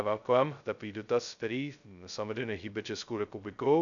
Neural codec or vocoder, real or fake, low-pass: codec, 16 kHz, 0.2 kbps, FocalCodec; fake; 7.2 kHz